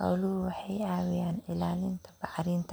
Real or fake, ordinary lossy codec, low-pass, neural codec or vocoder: real; none; none; none